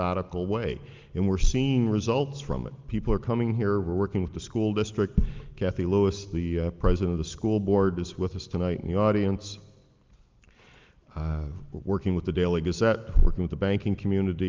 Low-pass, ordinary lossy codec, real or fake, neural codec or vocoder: 7.2 kHz; Opus, 32 kbps; real; none